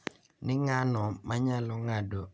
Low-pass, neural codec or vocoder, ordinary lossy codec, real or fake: none; none; none; real